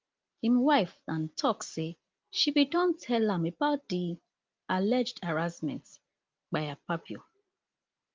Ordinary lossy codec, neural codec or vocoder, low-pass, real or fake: Opus, 32 kbps; none; 7.2 kHz; real